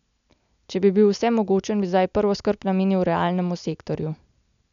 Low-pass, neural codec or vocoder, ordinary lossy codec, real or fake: 7.2 kHz; none; none; real